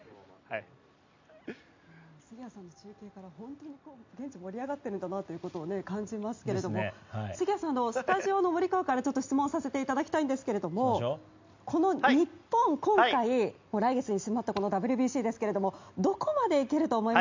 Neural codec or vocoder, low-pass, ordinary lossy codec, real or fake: none; 7.2 kHz; MP3, 64 kbps; real